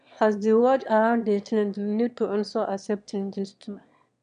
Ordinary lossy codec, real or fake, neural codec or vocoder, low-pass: none; fake; autoencoder, 22.05 kHz, a latent of 192 numbers a frame, VITS, trained on one speaker; 9.9 kHz